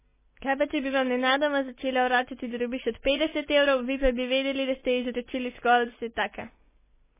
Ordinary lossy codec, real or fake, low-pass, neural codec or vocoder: MP3, 16 kbps; real; 3.6 kHz; none